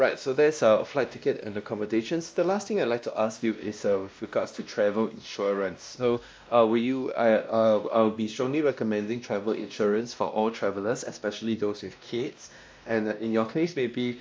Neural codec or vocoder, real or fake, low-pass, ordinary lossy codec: codec, 16 kHz, 1 kbps, X-Codec, WavLM features, trained on Multilingual LibriSpeech; fake; none; none